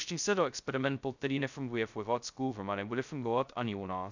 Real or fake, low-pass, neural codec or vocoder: fake; 7.2 kHz; codec, 16 kHz, 0.2 kbps, FocalCodec